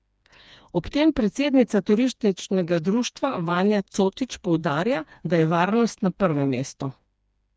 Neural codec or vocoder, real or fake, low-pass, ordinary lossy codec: codec, 16 kHz, 2 kbps, FreqCodec, smaller model; fake; none; none